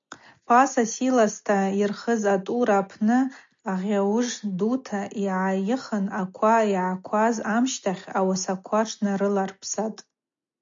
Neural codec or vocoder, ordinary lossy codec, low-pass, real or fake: none; MP3, 48 kbps; 7.2 kHz; real